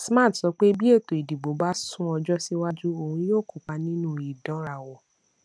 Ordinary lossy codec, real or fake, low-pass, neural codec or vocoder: none; real; none; none